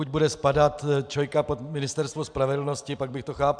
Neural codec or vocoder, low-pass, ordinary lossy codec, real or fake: none; 9.9 kHz; MP3, 96 kbps; real